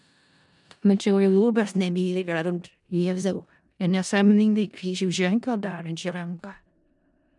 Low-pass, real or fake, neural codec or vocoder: 10.8 kHz; fake; codec, 16 kHz in and 24 kHz out, 0.4 kbps, LongCat-Audio-Codec, four codebook decoder